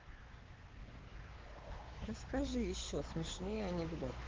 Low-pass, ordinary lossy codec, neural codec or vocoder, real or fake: 7.2 kHz; Opus, 16 kbps; codec, 16 kHz, 4 kbps, X-Codec, WavLM features, trained on Multilingual LibriSpeech; fake